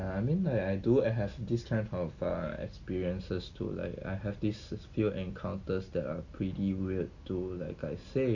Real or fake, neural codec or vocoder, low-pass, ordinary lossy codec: real; none; 7.2 kHz; Opus, 64 kbps